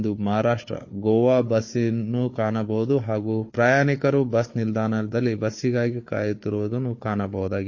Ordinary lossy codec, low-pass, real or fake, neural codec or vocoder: MP3, 32 kbps; 7.2 kHz; fake; codec, 16 kHz, 4 kbps, FunCodec, trained on Chinese and English, 50 frames a second